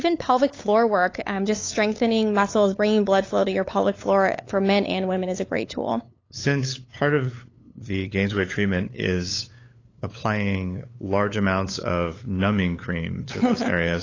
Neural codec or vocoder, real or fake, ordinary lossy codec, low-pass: codec, 16 kHz, 8 kbps, FunCodec, trained on LibriTTS, 25 frames a second; fake; AAC, 32 kbps; 7.2 kHz